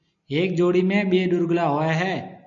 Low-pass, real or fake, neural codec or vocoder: 7.2 kHz; real; none